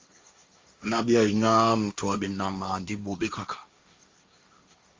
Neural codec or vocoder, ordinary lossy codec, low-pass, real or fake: codec, 16 kHz, 1.1 kbps, Voila-Tokenizer; Opus, 32 kbps; 7.2 kHz; fake